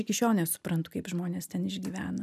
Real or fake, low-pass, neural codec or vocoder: real; 14.4 kHz; none